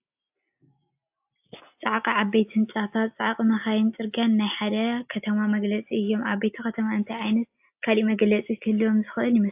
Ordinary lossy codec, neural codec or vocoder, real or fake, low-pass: AAC, 32 kbps; none; real; 3.6 kHz